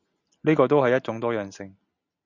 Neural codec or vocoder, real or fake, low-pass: none; real; 7.2 kHz